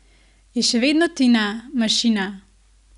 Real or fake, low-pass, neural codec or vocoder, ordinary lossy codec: real; 10.8 kHz; none; none